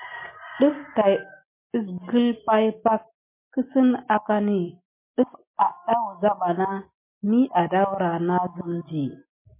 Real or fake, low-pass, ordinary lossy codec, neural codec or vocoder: real; 3.6 kHz; MP3, 24 kbps; none